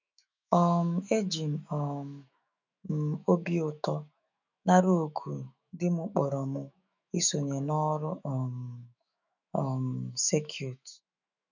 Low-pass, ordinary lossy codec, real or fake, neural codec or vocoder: 7.2 kHz; none; fake; autoencoder, 48 kHz, 128 numbers a frame, DAC-VAE, trained on Japanese speech